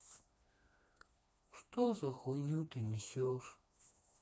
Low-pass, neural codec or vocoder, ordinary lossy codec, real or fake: none; codec, 16 kHz, 2 kbps, FreqCodec, smaller model; none; fake